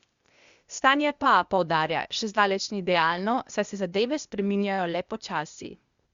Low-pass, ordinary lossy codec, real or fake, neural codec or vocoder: 7.2 kHz; Opus, 64 kbps; fake; codec, 16 kHz, 0.8 kbps, ZipCodec